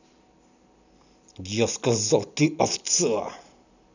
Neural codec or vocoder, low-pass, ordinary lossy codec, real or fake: none; 7.2 kHz; none; real